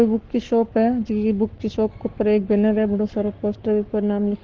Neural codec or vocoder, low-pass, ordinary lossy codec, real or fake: codec, 44.1 kHz, 7.8 kbps, Pupu-Codec; 7.2 kHz; Opus, 16 kbps; fake